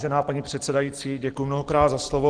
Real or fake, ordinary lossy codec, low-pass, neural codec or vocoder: real; Opus, 16 kbps; 9.9 kHz; none